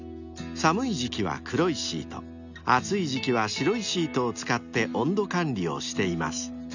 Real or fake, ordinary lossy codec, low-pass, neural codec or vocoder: real; none; 7.2 kHz; none